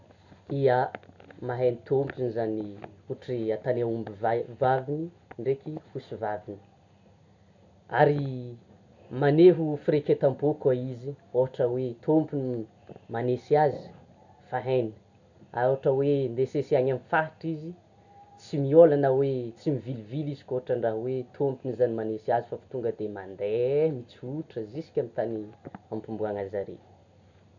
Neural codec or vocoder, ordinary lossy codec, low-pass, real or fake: none; none; 7.2 kHz; real